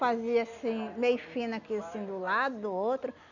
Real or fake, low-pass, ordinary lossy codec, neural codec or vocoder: real; 7.2 kHz; none; none